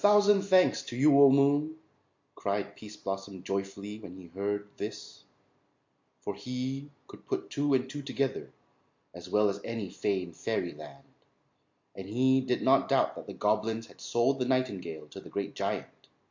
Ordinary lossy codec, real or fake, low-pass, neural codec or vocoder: MP3, 48 kbps; real; 7.2 kHz; none